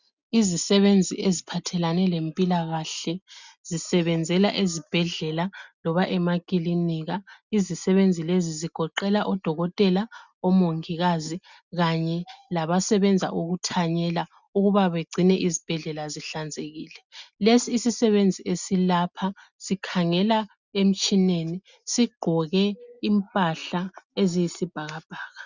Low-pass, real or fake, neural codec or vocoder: 7.2 kHz; real; none